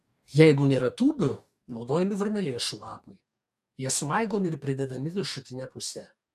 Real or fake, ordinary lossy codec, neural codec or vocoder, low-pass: fake; AAC, 96 kbps; codec, 44.1 kHz, 2.6 kbps, DAC; 14.4 kHz